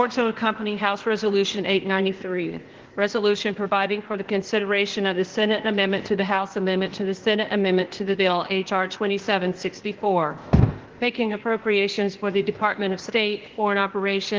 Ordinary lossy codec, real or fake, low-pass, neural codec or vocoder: Opus, 16 kbps; fake; 7.2 kHz; codec, 16 kHz, 0.8 kbps, ZipCodec